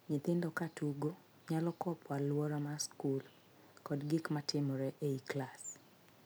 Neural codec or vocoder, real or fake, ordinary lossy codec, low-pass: none; real; none; none